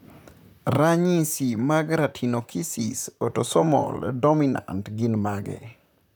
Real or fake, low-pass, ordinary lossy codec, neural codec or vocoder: fake; none; none; vocoder, 44.1 kHz, 128 mel bands, Pupu-Vocoder